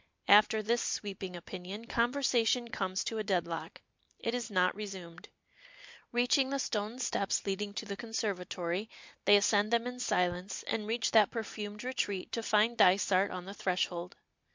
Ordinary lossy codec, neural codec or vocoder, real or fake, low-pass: MP3, 64 kbps; none; real; 7.2 kHz